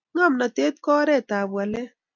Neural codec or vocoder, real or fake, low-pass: none; real; 7.2 kHz